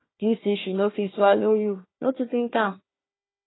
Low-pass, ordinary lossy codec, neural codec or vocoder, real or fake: 7.2 kHz; AAC, 16 kbps; codec, 16 kHz, 1 kbps, FunCodec, trained on Chinese and English, 50 frames a second; fake